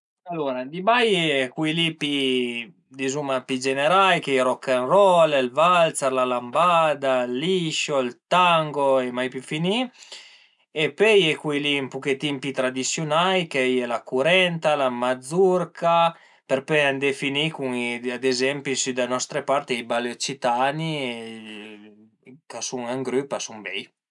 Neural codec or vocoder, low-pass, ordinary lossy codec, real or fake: none; 10.8 kHz; none; real